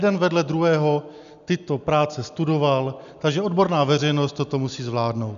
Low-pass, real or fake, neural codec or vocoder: 7.2 kHz; real; none